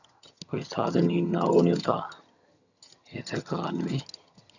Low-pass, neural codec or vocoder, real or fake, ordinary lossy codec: 7.2 kHz; vocoder, 22.05 kHz, 80 mel bands, HiFi-GAN; fake; none